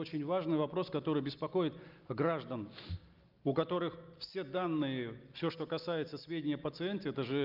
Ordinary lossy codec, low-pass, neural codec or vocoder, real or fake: Opus, 24 kbps; 5.4 kHz; none; real